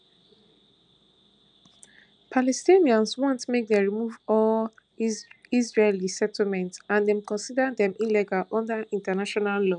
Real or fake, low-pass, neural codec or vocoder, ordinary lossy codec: real; 9.9 kHz; none; none